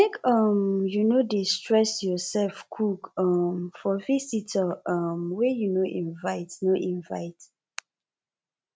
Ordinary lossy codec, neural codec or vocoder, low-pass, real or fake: none; none; none; real